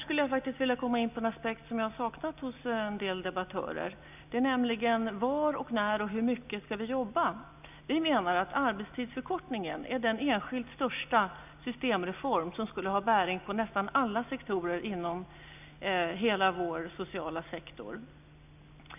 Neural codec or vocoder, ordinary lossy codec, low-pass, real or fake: none; none; 3.6 kHz; real